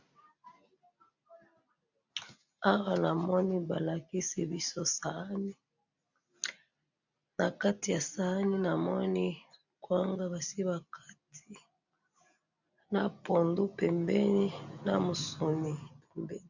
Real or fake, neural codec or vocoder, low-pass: real; none; 7.2 kHz